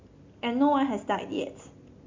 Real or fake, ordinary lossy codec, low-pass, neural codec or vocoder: real; MP3, 48 kbps; 7.2 kHz; none